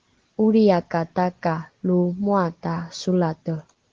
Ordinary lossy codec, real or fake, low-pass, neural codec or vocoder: Opus, 16 kbps; real; 7.2 kHz; none